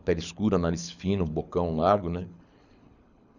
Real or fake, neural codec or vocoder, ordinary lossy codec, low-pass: fake; codec, 24 kHz, 6 kbps, HILCodec; none; 7.2 kHz